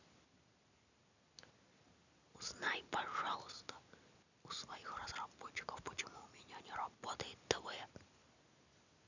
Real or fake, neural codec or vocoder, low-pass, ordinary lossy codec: real; none; 7.2 kHz; none